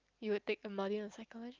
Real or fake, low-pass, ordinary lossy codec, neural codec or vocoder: real; 7.2 kHz; Opus, 24 kbps; none